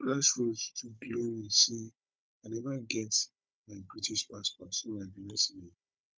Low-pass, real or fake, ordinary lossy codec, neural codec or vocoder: none; fake; none; codec, 16 kHz, 16 kbps, FunCodec, trained on Chinese and English, 50 frames a second